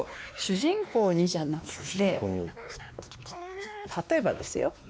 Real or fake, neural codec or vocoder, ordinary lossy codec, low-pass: fake; codec, 16 kHz, 2 kbps, X-Codec, WavLM features, trained on Multilingual LibriSpeech; none; none